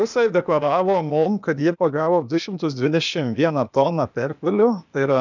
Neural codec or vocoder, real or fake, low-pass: codec, 16 kHz, 0.8 kbps, ZipCodec; fake; 7.2 kHz